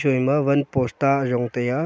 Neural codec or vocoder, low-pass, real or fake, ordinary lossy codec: none; none; real; none